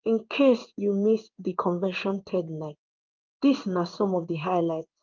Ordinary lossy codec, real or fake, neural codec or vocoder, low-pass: Opus, 24 kbps; fake; codec, 16 kHz in and 24 kHz out, 1 kbps, XY-Tokenizer; 7.2 kHz